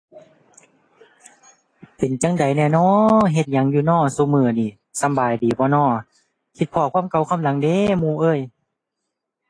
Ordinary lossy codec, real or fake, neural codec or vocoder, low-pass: AAC, 32 kbps; real; none; 9.9 kHz